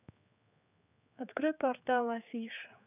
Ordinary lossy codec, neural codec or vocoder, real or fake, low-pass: none; codec, 16 kHz, 4 kbps, X-Codec, HuBERT features, trained on general audio; fake; 3.6 kHz